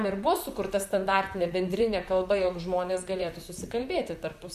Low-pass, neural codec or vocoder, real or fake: 14.4 kHz; vocoder, 44.1 kHz, 128 mel bands, Pupu-Vocoder; fake